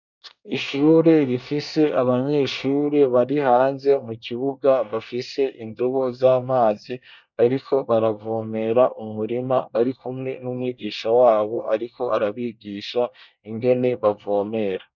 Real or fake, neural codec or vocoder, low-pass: fake; codec, 24 kHz, 1 kbps, SNAC; 7.2 kHz